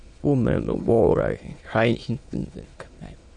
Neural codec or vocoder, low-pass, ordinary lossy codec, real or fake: autoencoder, 22.05 kHz, a latent of 192 numbers a frame, VITS, trained on many speakers; 9.9 kHz; MP3, 48 kbps; fake